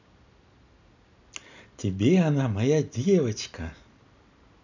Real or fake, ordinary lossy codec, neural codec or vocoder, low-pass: real; none; none; 7.2 kHz